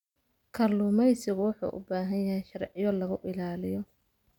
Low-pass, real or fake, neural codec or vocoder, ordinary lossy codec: 19.8 kHz; real; none; none